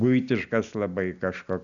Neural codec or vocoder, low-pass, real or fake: none; 7.2 kHz; real